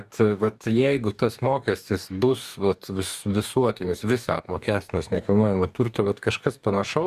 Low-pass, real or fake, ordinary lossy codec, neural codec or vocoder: 14.4 kHz; fake; Opus, 64 kbps; codec, 44.1 kHz, 2.6 kbps, DAC